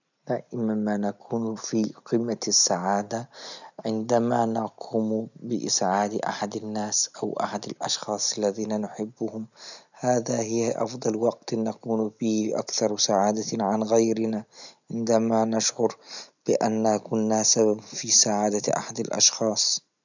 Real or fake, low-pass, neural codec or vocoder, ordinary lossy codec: real; 7.2 kHz; none; none